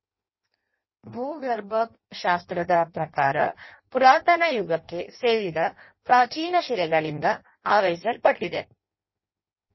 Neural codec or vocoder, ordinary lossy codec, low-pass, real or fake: codec, 16 kHz in and 24 kHz out, 0.6 kbps, FireRedTTS-2 codec; MP3, 24 kbps; 7.2 kHz; fake